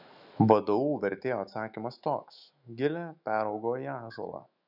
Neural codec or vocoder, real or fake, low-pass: autoencoder, 48 kHz, 128 numbers a frame, DAC-VAE, trained on Japanese speech; fake; 5.4 kHz